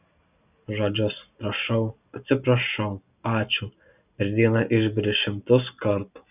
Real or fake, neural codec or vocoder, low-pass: real; none; 3.6 kHz